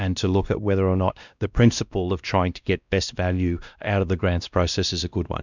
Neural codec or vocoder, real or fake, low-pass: codec, 16 kHz, 1 kbps, X-Codec, WavLM features, trained on Multilingual LibriSpeech; fake; 7.2 kHz